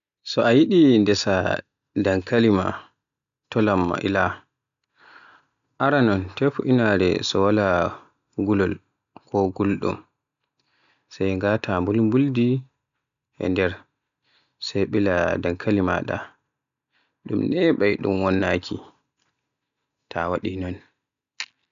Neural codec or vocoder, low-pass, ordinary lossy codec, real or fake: none; 7.2 kHz; none; real